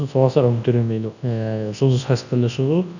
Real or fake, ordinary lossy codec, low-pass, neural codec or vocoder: fake; none; 7.2 kHz; codec, 24 kHz, 0.9 kbps, WavTokenizer, large speech release